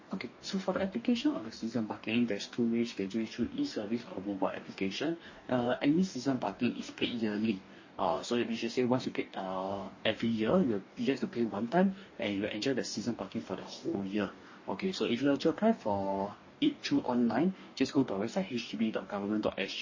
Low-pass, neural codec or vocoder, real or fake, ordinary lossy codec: 7.2 kHz; codec, 44.1 kHz, 2.6 kbps, DAC; fake; MP3, 32 kbps